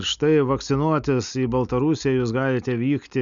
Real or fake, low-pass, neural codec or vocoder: real; 7.2 kHz; none